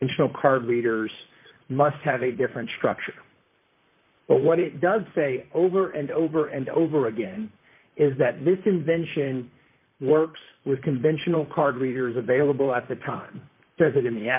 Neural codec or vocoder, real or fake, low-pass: vocoder, 44.1 kHz, 128 mel bands, Pupu-Vocoder; fake; 3.6 kHz